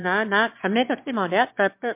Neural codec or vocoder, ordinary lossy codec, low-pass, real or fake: autoencoder, 22.05 kHz, a latent of 192 numbers a frame, VITS, trained on one speaker; MP3, 32 kbps; 3.6 kHz; fake